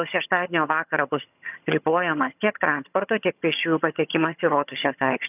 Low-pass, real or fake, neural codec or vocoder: 3.6 kHz; fake; vocoder, 22.05 kHz, 80 mel bands, HiFi-GAN